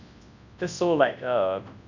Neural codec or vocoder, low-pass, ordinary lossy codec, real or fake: codec, 24 kHz, 0.9 kbps, WavTokenizer, large speech release; 7.2 kHz; none; fake